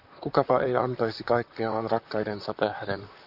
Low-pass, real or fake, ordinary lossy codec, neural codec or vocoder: 5.4 kHz; fake; Opus, 64 kbps; vocoder, 44.1 kHz, 128 mel bands, Pupu-Vocoder